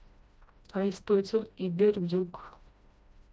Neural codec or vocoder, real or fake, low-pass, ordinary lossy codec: codec, 16 kHz, 1 kbps, FreqCodec, smaller model; fake; none; none